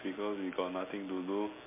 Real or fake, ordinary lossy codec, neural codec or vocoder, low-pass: real; MP3, 24 kbps; none; 3.6 kHz